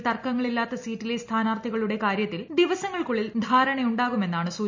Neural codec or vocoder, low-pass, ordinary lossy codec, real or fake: none; 7.2 kHz; MP3, 64 kbps; real